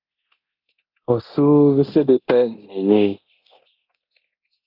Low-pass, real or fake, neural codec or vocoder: 5.4 kHz; fake; codec, 24 kHz, 0.9 kbps, DualCodec